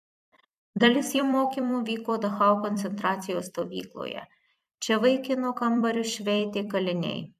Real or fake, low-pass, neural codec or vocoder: fake; 14.4 kHz; vocoder, 44.1 kHz, 128 mel bands every 256 samples, BigVGAN v2